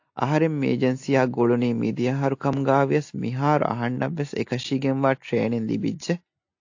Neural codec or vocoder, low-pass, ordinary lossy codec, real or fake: none; 7.2 kHz; AAC, 48 kbps; real